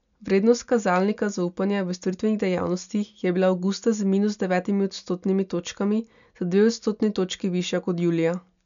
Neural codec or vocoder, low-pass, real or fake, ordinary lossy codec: none; 7.2 kHz; real; none